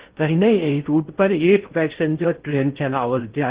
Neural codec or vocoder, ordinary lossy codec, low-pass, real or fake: codec, 16 kHz in and 24 kHz out, 0.6 kbps, FocalCodec, streaming, 2048 codes; Opus, 16 kbps; 3.6 kHz; fake